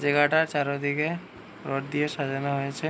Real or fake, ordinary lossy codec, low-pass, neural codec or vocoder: real; none; none; none